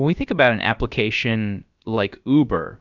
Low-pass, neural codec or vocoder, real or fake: 7.2 kHz; codec, 16 kHz, about 1 kbps, DyCAST, with the encoder's durations; fake